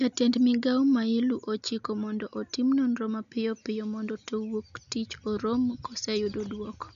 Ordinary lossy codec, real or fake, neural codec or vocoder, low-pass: none; real; none; 7.2 kHz